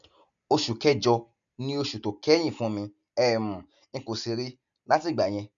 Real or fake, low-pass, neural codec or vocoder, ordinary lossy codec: real; 7.2 kHz; none; none